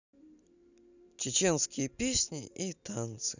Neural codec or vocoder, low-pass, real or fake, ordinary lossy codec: none; 7.2 kHz; real; none